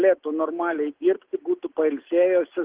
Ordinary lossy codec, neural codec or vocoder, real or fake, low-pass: Opus, 16 kbps; none; real; 3.6 kHz